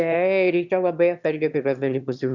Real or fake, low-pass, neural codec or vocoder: fake; 7.2 kHz; autoencoder, 22.05 kHz, a latent of 192 numbers a frame, VITS, trained on one speaker